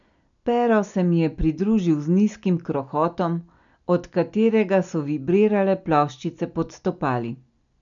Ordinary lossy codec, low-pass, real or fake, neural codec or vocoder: none; 7.2 kHz; real; none